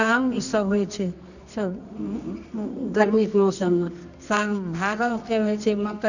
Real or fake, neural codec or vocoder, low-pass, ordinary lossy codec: fake; codec, 24 kHz, 0.9 kbps, WavTokenizer, medium music audio release; 7.2 kHz; none